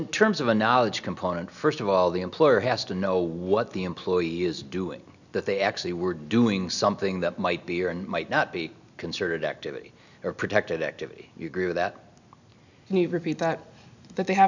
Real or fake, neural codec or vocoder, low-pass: real; none; 7.2 kHz